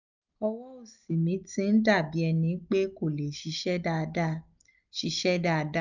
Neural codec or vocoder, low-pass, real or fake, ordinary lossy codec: none; 7.2 kHz; real; none